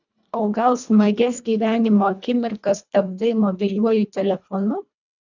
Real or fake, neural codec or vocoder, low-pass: fake; codec, 24 kHz, 1.5 kbps, HILCodec; 7.2 kHz